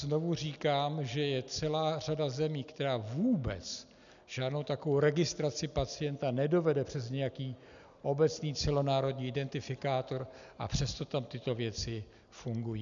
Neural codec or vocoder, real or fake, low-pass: none; real; 7.2 kHz